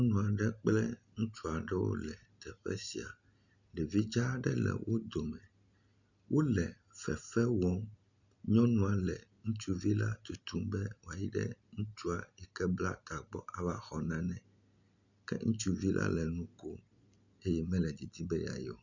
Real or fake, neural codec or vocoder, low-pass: real; none; 7.2 kHz